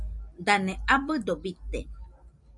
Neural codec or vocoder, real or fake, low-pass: none; real; 10.8 kHz